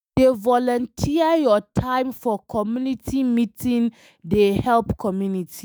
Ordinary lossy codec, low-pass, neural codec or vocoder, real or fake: none; none; autoencoder, 48 kHz, 128 numbers a frame, DAC-VAE, trained on Japanese speech; fake